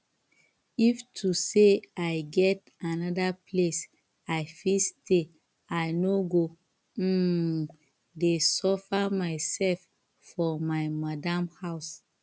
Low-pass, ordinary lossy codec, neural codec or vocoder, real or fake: none; none; none; real